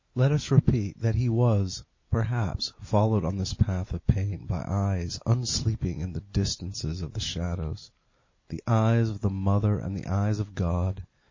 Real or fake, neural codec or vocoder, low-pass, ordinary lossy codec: real; none; 7.2 kHz; MP3, 32 kbps